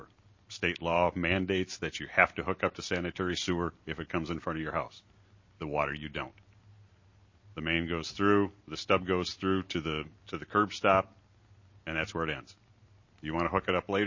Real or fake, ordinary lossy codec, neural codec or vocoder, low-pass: real; MP3, 32 kbps; none; 7.2 kHz